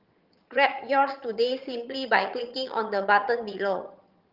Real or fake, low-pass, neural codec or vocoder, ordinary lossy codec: fake; 5.4 kHz; vocoder, 22.05 kHz, 80 mel bands, HiFi-GAN; Opus, 24 kbps